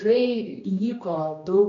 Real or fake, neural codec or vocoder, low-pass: fake; codec, 16 kHz, 1 kbps, X-Codec, HuBERT features, trained on general audio; 7.2 kHz